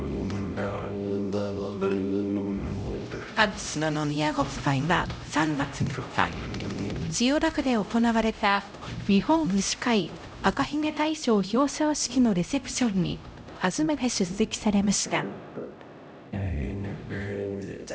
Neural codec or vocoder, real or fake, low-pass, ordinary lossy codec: codec, 16 kHz, 0.5 kbps, X-Codec, HuBERT features, trained on LibriSpeech; fake; none; none